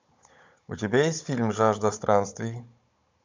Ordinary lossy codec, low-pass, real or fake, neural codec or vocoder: MP3, 64 kbps; 7.2 kHz; fake; codec, 16 kHz, 16 kbps, FunCodec, trained on Chinese and English, 50 frames a second